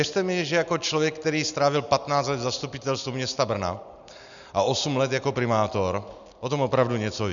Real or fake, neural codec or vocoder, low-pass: real; none; 7.2 kHz